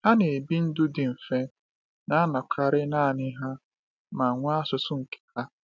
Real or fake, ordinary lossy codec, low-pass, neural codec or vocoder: real; none; none; none